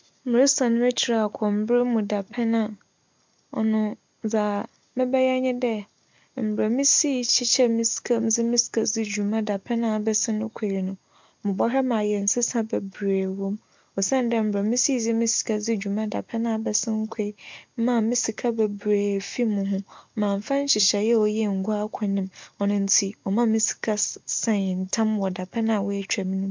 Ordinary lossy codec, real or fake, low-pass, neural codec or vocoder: MP3, 64 kbps; real; 7.2 kHz; none